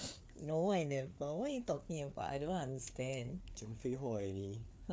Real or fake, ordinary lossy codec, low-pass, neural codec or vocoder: fake; none; none; codec, 16 kHz, 4 kbps, FreqCodec, larger model